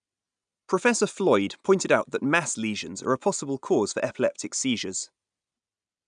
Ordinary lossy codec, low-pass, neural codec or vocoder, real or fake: none; 9.9 kHz; none; real